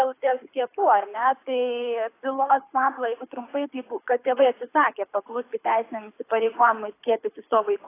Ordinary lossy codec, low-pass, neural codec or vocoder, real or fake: AAC, 24 kbps; 3.6 kHz; codec, 24 kHz, 6 kbps, HILCodec; fake